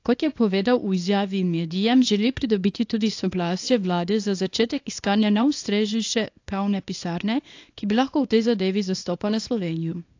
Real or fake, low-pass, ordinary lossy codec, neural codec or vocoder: fake; 7.2 kHz; AAC, 48 kbps; codec, 24 kHz, 0.9 kbps, WavTokenizer, small release